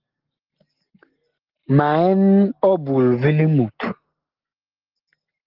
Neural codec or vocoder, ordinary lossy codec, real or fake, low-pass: none; Opus, 16 kbps; real; 5.4 kHz